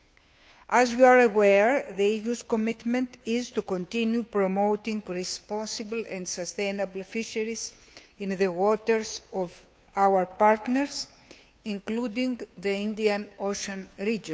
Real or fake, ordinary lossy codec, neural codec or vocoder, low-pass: fake; none; codec, 16 kHz, 2 kbps, FunCodec, trained on Chinese and English, 25 frames a second; none